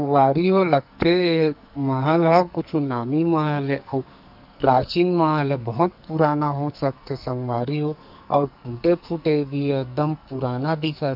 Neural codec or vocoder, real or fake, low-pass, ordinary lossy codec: codec, 44.1 kHz, 2.6 kbps, SNAC; fake; 5.4 kHz; none